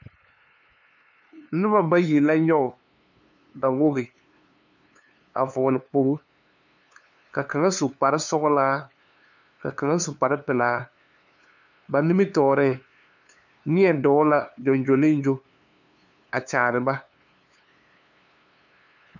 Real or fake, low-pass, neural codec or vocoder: fake; 7.2 kHz; codec, 16 kHz, 2 kbps, FunCodec, trained on LibriTTS, 25 frames a second